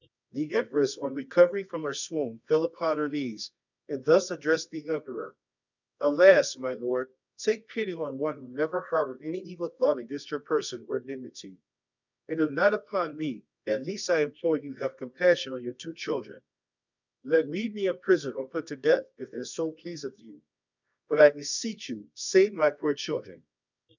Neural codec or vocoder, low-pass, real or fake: codec, 24 kHz, 0.9 kbps, WavTokenizer, medium music audio release; 7.2 kHz; fake